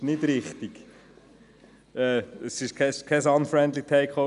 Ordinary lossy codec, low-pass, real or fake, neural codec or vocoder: none; 10.8 kHz; real; none